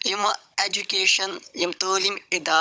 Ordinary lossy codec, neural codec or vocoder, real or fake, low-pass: none; codec, 16 kHz, 16 kbps, FunCodec, trained on Chinese and English, 50 frames a second; fake; none